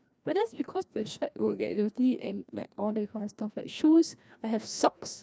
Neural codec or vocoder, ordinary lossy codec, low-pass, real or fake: codec, 16 kHz, 1 kbps, FreqCodec, larger model; none; none; fake